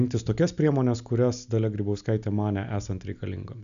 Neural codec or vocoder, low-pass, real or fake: none; 7.2 kHz; real